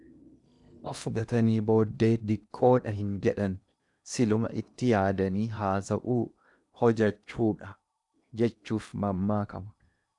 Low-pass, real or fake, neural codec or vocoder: 10.8 kHz; fake; codec, 16 kHz in and 24 kHz out, 0.8 kbps, FocalCodec, streaming, 65536 codes